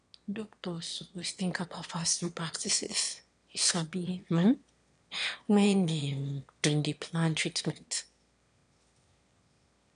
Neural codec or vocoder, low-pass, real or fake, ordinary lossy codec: autoencoder, 22.05 kHz, a latent of 192 numbers a frame, VITS, trained on one speaker; 9.9 kHz; fake; none